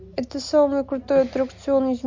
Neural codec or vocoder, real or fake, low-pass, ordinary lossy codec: none; real; 7.2 kHz; MP3, 48 kbps